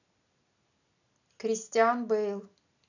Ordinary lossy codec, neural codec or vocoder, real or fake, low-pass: none; none; real; 7.2 kHz